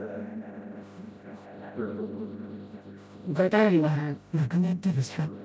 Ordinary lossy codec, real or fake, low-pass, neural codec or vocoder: none; fake; none; codec, 16 kHz, 0.5 kbps, FreqCodec, smaller model